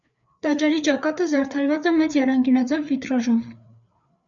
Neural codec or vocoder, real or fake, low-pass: codec, 16 kHz, 4 kbps, FreqCodec, larger model; fake; 7.2 kHz